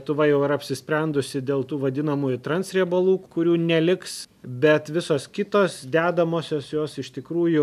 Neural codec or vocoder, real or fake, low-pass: none; real; 14.4 kHz